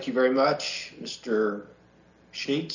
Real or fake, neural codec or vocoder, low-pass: real; none; 7.2 kHz